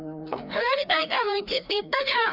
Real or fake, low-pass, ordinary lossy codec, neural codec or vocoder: fake; 5.4 kHz; none; codec, 16 kHz, 1 kbps, FreqCodec, larger model